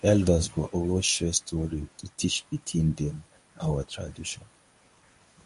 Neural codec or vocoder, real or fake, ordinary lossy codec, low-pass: codec, 24 kHz, 0.9 kbps, WavTokenizer, medium speech release version 1; fake; MP3, 48 kbps; 10.8 kHz